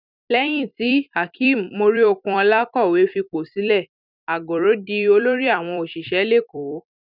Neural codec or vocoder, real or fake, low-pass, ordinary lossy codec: vocoder, 44.1 kHz, 128 mel bands every 256 samples, BigVGAN v2; fake; 5.4 kHz; none